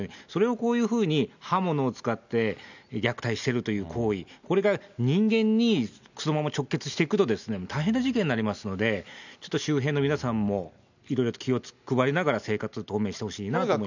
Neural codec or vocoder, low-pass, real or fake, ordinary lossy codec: none; 7.2 kHz; real; none